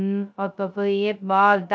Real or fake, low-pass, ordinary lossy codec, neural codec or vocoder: fake; none; none; codec, 16 kHz, 0.2 kbps, FocalCodec